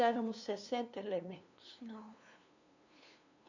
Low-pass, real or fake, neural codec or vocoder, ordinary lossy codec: 7.2 kHz; fake; codec, 16 kHz, 2 kbps, FunCodec, trained on LibriTTS, 25 frames a second; none